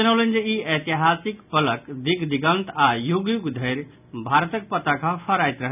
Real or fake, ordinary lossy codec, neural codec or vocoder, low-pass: real; none; none; 3.6 kHz